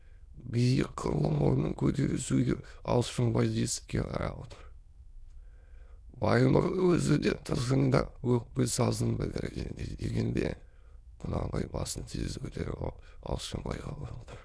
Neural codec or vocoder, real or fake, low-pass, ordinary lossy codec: autoencoder, 22.05 kHz, a latent of 192 numbers a frame, VITS, trained on many speakers; fake; none; none